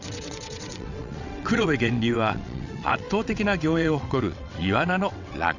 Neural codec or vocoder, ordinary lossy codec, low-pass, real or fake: vocoder, 22.05 kHz, 80 mel bands, WaveNeXt; none; 7.2 kHz; fake